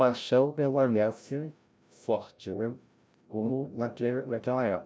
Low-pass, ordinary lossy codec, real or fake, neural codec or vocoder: none; none; fake; codec, 16 kHz, 0.5 kbps, FreqCodec, larger model